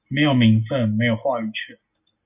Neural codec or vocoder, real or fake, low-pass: none; real; 3.6 kHz